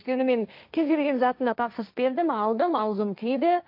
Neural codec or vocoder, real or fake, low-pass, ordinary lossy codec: codec, 16 kHz, 1.1 kbps, Voila-Tokenizer; fake; 5.4 kHz; none